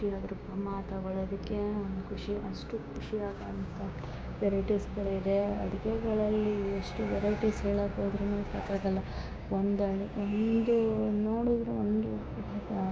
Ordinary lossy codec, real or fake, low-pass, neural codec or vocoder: none; fake; none; codec, 16 kHz, 6 kbps, DAC